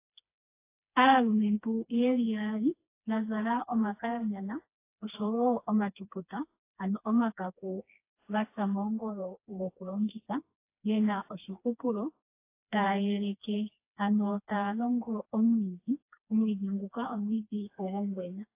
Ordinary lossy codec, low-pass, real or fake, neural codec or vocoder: AAC, 24 kbps; 3.6 kHz; fake; codec, 16 kHz, 2 kbps, FreqCodec, smaller model